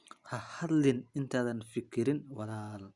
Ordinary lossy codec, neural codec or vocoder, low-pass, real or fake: Opus, 64 kbps; none; 10.8 kHz; real